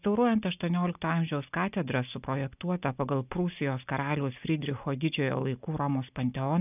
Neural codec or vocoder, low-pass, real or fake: none; 3.6 kHz; real